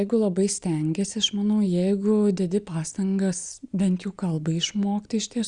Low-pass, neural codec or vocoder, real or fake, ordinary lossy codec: 9.9 kHz; none; real; Opus, 64 kbps